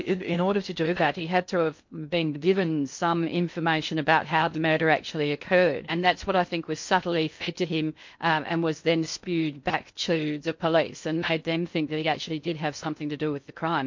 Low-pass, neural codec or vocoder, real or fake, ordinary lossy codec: 7.2 kHz; codec, 16 kHz in and 24 kHz out, 0.6 kbps, FocalCodec, streaming, 2048 codes; fake; MP3, 48 kbps